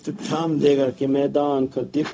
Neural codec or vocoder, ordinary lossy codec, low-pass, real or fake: codec, 16 kHz, 0.4 kbps, LongCat-Audio-Codec; none; none; fake